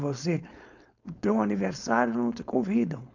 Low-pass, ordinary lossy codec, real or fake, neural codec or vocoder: 7.2 kHz; none; fake; codec, 16 kHz, 4.8 kbps, FACodec